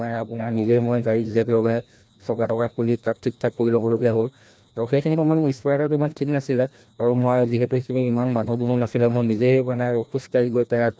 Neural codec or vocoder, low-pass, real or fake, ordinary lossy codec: codec, 16 kHz, 1 kbps, FreqCodec, larger model; none; fake; none